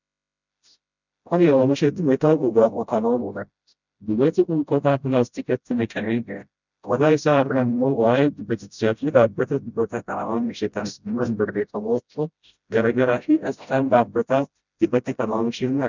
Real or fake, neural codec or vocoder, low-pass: fake; codec, 16 kHz, 0.5 kbps, FreqCodec, smaller model; 7.2 kHz